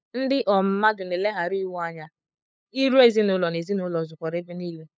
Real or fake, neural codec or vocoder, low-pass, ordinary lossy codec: fake; codec, 16 kHz, 8 kbps, FunCodec, trained on LibriTTS, 25 frames a second; none; none